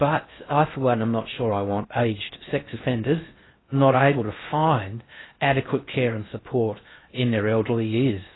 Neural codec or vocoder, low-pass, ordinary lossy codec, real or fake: codec, 16 kHz in and 24 kHz out, 0.6 kbps, FocalCodec, streaming, 4096 codes; 7.2 kHz; AAC, 16 kbps; fake